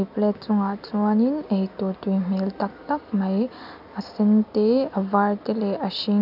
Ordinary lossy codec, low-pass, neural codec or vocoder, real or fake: none; 5.4 kHz; none; real